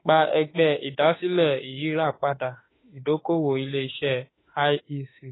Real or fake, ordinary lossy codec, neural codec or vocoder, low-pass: fake; AAC, 16 kbps; autoencoder, 48 kHz, 32 numbers a frame, DAC-VAE, trained on Japanese speech; 7.2 kHz